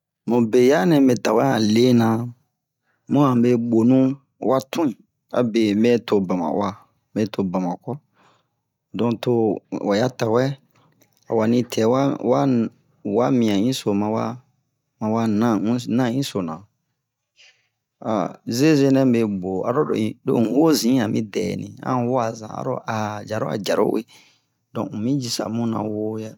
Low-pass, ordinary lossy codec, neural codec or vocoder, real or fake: 19.8 kHz; none; none; real